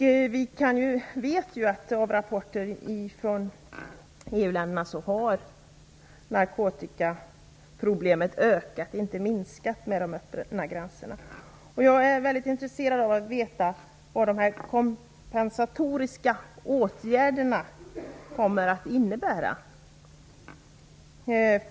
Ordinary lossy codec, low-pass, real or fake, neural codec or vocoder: none; none; real; none